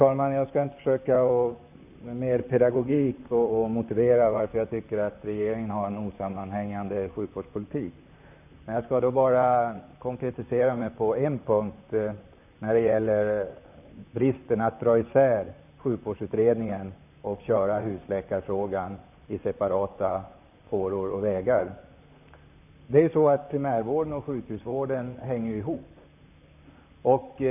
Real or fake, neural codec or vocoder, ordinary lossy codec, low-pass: fake; vocoder, 44.1 kHz, 128 mel bands, Pupu-Vocoder; none; 3.6 kHz